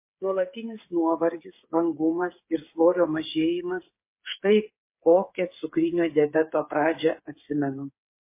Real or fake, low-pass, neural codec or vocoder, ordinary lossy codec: fake; 3.6 kHz; codec, 16 kHz, 8 kbps, FreqCodec, smaller model; MP3, 24 kbps